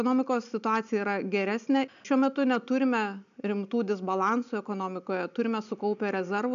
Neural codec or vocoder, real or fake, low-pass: none; real; 7.2 kHz